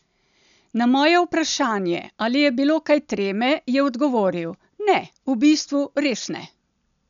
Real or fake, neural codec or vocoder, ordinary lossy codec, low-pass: real; none; none; 7.2 kHz